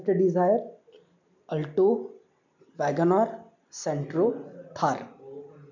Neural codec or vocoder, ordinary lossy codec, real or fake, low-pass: none; none; real; 7.2 kHz